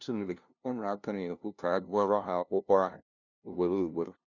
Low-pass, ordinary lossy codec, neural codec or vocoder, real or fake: 7.2 kHz; none; codec, 16 kHz, 0.5 kbps, FunCodec, trained on LibriTTS, 25 frames a second; fake